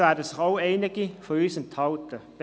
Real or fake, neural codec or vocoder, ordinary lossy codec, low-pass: real; none; none; none